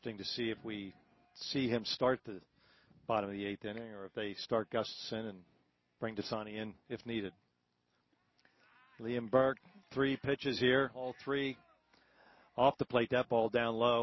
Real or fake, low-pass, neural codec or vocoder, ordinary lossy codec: real; 7.2 kHz; none; MP3, 24 kbps